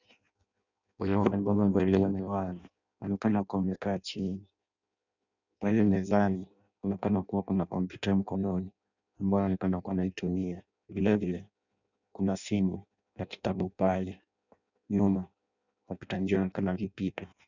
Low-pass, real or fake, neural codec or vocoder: 7.2 kHz; fake; codec, 16 kHz in and 24 kHz out, 0.6 kbps, FireRedTTS-2 codec